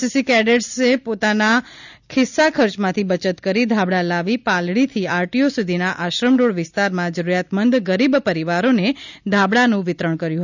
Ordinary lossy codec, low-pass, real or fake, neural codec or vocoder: none; 7.2 kHz; real; none